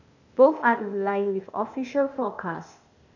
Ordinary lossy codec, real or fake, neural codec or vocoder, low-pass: none; fake; codec, 16 kHz, 0.8 kbps, ZipCodec; 7.2 kHz